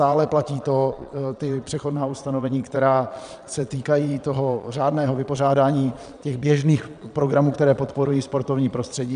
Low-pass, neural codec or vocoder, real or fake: 9.9 kHz; vocoder, 22.05 kHz, 80 mel bands, Vocos; fake